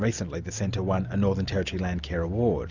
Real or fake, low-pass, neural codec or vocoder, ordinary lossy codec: real; 7.2 kHz; none; Opus, 64 kbps